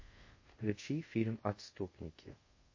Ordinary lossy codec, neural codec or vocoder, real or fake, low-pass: MP3, 32 kbps; codec, 24 kHz, 0.5 kbps, DualCodec; fake; 7.2 kHz